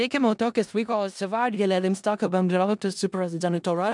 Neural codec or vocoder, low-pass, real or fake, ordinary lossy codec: codec, 16 kHz in and 24 kHz out, 0.4 kbps, LongCat-Audio-Codec, four codebook decoder; 10.8 kHz; fake; MP3, 96 kbps